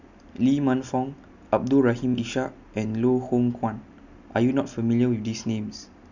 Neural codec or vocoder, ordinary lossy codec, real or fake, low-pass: none; none; real; 7.2 kHz